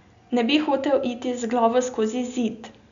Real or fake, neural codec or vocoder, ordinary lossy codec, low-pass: real; none; none; 7.2 kHz